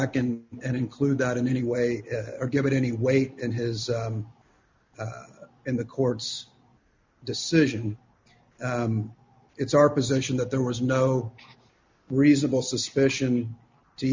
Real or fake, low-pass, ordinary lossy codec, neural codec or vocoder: real; 7.2 kHz; MP3, 64 kbps; none